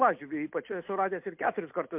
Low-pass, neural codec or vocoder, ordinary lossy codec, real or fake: 3.6 kHz; none; MP3, 32 kbps; real